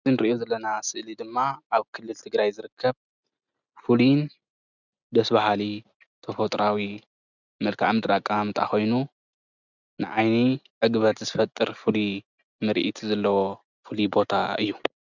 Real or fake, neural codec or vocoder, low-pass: real; none; 7.2 kHz